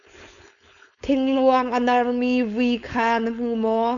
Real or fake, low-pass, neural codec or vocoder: fake; 7.2 kHz; codec, 16 kHz, 4.8 kbps, FACodec